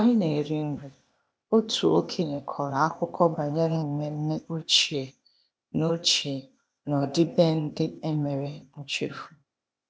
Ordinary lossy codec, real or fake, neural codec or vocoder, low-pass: none; fake; codec, 16 kHz, 0.8 kbps, ZipCodec; none